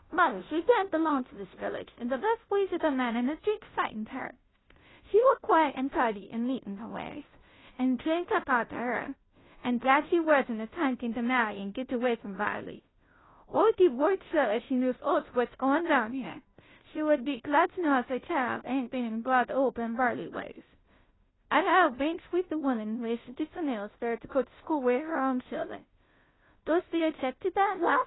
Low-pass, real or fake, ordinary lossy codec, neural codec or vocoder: 7.2 kHz; fake; AAC, 16 kbps; codec, 16 kHz, 0.5 kbps, FunCodec, trained on Chinese and English, 25 frames a second